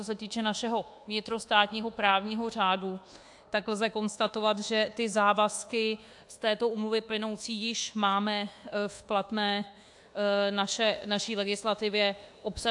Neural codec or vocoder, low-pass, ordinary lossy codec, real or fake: codec, 24 kHz, 1.2 kbps, DualCodec; 10.8 kHz; AAC, 64 kbps; fake